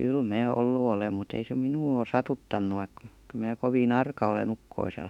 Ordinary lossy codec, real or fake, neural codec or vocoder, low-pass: none; fake; autoencoder, 48 kHz, 32 numbers a frame, DAC-VAE, trained on Japanese speech; 19.8 kHz